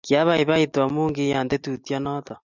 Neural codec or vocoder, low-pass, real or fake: none; 7.2 kHz; real